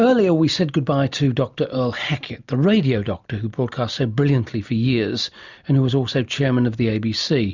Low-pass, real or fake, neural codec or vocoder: 7.2 kHz; real; none